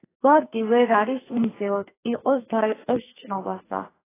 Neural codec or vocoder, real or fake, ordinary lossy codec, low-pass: codec, 44.1 kHz, 2.6 kbps, SNAC; fake; AAC, 16 kbps; 3.6 kHz